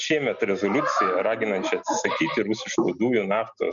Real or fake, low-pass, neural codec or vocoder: real; 7.2 kHz; none